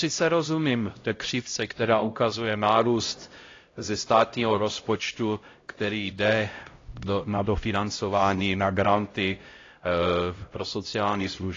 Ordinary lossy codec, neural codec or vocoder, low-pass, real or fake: AAC, 32 kbps; codec, 16 kHz, 0.5 kbps, X-Codec, HuBERT features, trained on LibriSpeech; 7.2 kHz; fake